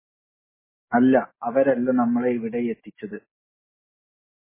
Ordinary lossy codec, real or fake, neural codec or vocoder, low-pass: MP3, 16 kbps; real; none; 3.6 kHz